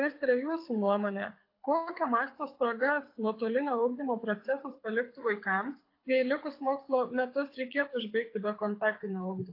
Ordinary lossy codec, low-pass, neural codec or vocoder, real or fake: AAC, 32 kbps; 5.4 kHz; codec, 24 kHz, 6 kbps, HILCodec; fake